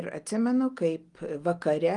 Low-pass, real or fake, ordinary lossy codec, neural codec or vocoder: 10.8 kHz; real; Opus, 32 kbps; none